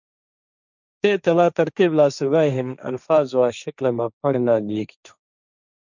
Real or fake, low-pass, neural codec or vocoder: fake; 7.2 kHz; codec, 16 kHz, 1.1 kbps, Voila-Tokenizer